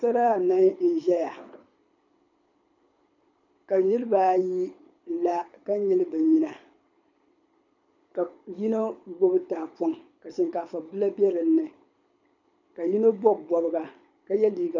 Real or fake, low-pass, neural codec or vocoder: fake; 7.2 kHz; codec, 24 kHz, 6 kbps, HILCodec